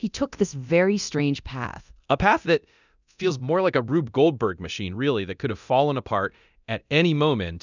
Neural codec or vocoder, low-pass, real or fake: codec, 24 kHz, 0.9 kbps, DualCodec; 7.2 kHz; fake